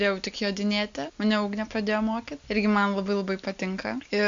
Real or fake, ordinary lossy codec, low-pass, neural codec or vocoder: real; AAC, 64 kbps; 7.2 kHz; none